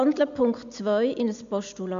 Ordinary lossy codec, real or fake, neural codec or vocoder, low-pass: MP3, 64 kbps; real; none; 7.2 kHz